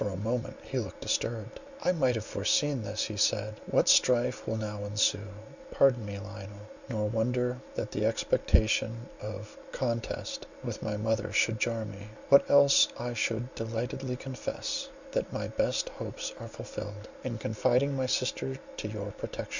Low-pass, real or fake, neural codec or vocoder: 7.2 kHz; real; none